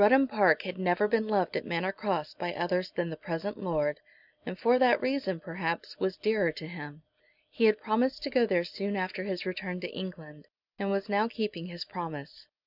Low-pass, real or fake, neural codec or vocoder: 5.4 kHz; real; none